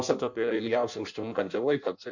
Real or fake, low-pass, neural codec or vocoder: fake; 7.2 kHz; codec, 16 kHz in and 24 kHz out, 0.6 kbps, FireRedTTS-2 codec